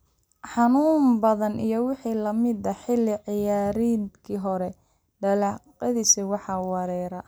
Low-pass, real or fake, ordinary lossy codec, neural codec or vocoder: none; real; none; none